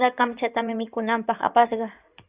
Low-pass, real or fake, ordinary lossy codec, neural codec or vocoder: 3.6 kHz; fake; Opus, 64 kbps; codec, 16 kHz in and 24 kHz out, 2.2 kbps, FireRedTTS-2 codec